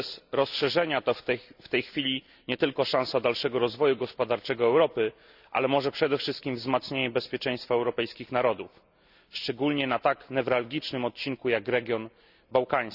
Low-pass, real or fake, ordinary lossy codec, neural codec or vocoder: 5.4 kHz; real; none; none